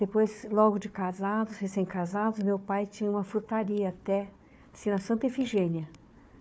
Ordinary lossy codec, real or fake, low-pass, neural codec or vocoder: none; fake; none; codec, 16 kHz, 4 kbps, FunCodec, trained on Chinese and English, 50 frames a second